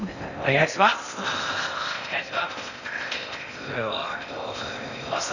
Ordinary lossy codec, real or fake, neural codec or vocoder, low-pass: none; fake; codec, 16 kHz in and 24 kHz out, 0.6 kbps, FocalCodec, streaming, 4096 codes; 7.2 kHz